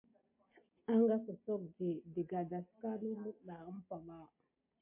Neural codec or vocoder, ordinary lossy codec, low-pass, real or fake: none; MP3, 24 kbps; 3.6 kHz; real